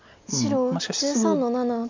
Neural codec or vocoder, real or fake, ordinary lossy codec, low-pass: none; real; MP3, 64 kbps; 7.2 kHz